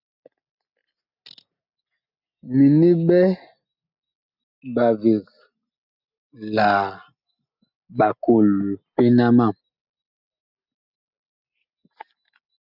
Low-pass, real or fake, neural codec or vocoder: 5.4 kHz; real; none